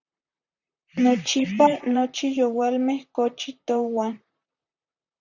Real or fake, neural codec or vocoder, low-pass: fake; vocoder, 44.1 kHz, 128 mel bands, Pupu-Vocoder; 7.2 kHz